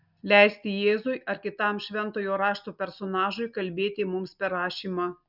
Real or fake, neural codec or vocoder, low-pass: real; none; 5.4 kHz